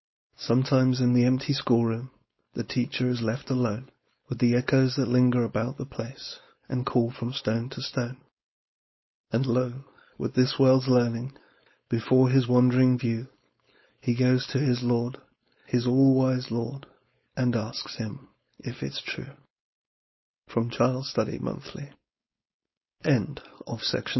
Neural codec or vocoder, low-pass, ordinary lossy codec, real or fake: codec, 16 kHz, 4.8 kbps, FACodec; 7.2 kHz; MP3, 24 kbps; fake